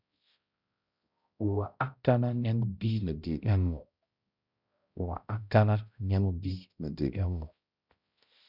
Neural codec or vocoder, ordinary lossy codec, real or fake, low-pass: codec, 16 kHz, 0.5 kbps, X-Codec, HuBERT features, trained on balanced general audio; Opus, 64 kbps; fake; 5.4 kHz